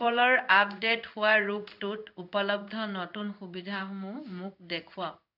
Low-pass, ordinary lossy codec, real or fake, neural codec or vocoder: 5.4 kHz; none; fake; codec, 16 kHz in and 24 kHz out, 1 kbps, XY-Tokenizer